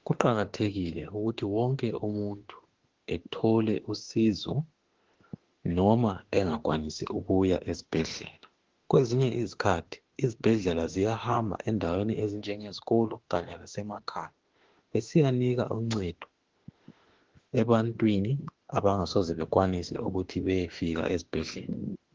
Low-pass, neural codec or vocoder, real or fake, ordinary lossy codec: 7.2 kHz; autoencoder, 48 kHz, 32 numbers a frame, DAC-VAE, trained on Japanese speech; fake; Opus, 16 kbps